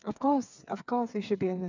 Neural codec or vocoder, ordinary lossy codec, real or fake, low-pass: codec, 16 kHz, 4 kbps, FreqCodec, smaller model; none; fake; 7.2 kHz